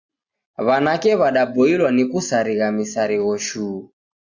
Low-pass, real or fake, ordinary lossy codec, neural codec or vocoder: 7.2 kHz; real; Opus, 64 kbps; none